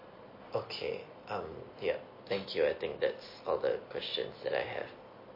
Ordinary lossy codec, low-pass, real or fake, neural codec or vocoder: MP3, 24 kbps; 5.4 kHz; real; none